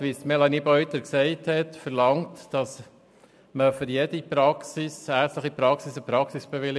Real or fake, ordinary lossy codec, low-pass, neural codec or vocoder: real; none; none; none